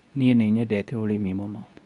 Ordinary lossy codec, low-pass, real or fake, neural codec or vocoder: none; 10.8 kHz; fake; codec, 24 kHz, 0.9 kbps, WavTokenizer, medium speech release version 2